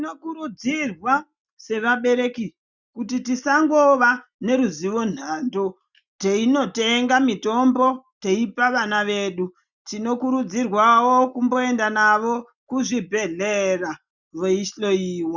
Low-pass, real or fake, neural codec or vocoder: 7.2 kHz; real; none